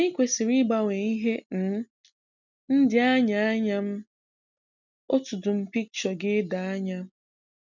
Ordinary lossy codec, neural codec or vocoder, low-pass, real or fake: none; none; 7.2 kHz; real